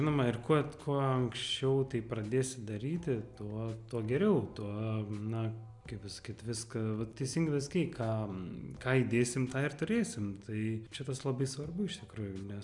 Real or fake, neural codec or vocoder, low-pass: real; none; 10.8 kHz